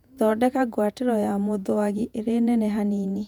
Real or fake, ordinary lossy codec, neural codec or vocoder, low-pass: fake; none; vocoder, 48 kHz, 128 mel bands, Vocos; 19.8 kHz